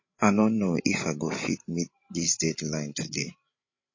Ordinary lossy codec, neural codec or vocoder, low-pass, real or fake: MP3, 32 kbps; codec, 16 kHz, 16 kbps, FreqCodec, larger model; 7.2 kHz; fake